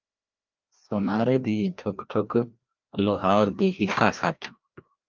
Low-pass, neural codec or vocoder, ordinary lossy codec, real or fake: 7.2 kHz; codec, 16 kHz, 1 kbps, FreqCodec, larger model; Opus, 24 kbps; fake